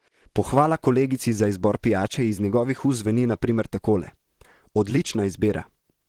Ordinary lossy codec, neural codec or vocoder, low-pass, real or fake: Opus, 16 kbps; vocoder, 44.1 kHz, 128 mel bands, Pupu-Vocoder; 19.8 kHz; fake